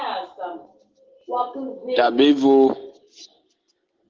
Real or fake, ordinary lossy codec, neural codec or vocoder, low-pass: real; Opus, 16 kbps; none; 7.2 kHz